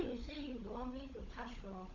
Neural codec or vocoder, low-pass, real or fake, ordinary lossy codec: codec, 16 kHz, 16 kbps, FunCodec, trained on LibriTTS, 50 frames a second; 7.2 kHz; fake; none